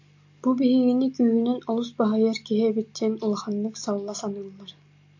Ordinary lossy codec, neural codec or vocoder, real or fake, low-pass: AAC, 48 kbps; none; real; 7.2 kHz